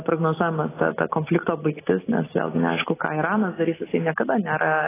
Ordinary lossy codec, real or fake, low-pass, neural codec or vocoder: AAC, 16 kbps; real; 3.6 kHz; none